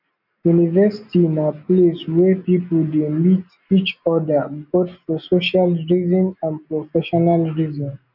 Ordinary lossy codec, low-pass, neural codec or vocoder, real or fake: none; 5.4 kHz; none; real